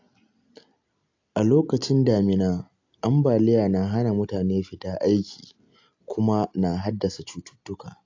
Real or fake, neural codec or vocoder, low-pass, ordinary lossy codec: real; none; 7.2 kHz; AAC, 48 kbps